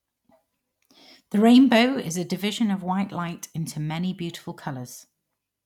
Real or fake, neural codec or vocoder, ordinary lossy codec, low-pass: real; none; none; 19.8 kHz